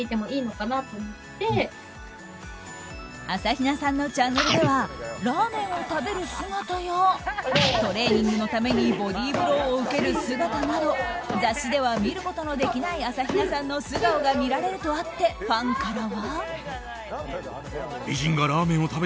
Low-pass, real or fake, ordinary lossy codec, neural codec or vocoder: none; real; none; none